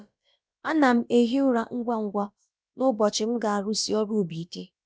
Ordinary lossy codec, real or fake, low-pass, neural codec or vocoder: none; fake; none; codec, 16 kHz, about 1 kbps, DyCAST, with the encoder's durations